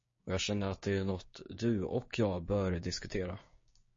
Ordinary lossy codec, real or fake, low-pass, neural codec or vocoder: MP3, 32 kbps; fake; 7.2 kHz; codec, 16 kHz, 6 kbps, DAC